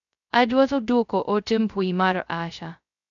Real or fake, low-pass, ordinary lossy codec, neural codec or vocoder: fake; 7.2 kHz; none; codec, 16 kHz, 0.2 kbps, FocalCodec